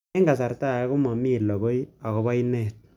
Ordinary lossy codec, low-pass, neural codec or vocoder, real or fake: none; 19.8 kHz; none; real